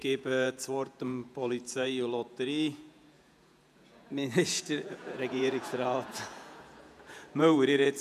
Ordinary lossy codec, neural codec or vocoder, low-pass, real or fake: none; vocoder, 48 kHz, 128 mel bands, Vocos; 14.4 kHz; fake